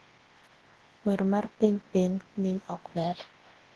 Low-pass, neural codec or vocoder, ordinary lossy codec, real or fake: 10.8 kHz; codec, 24 kHz, 0.9 kbps, WavTokenizer, large speech release; Opus, 16 kbps; fake